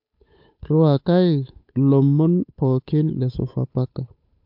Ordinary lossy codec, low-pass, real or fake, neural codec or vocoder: MP3, 48 kbps; 5.4 kHz; fake; codec, 16 kHz, 8 kbps, FunCodec, trained on Chinese and English, 25 frames a second